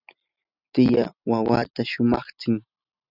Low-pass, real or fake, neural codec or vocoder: 5.4 kHz; real; none